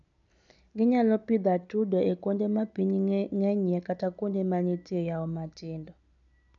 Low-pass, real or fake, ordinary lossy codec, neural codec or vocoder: 7.2 kHz; real; none; none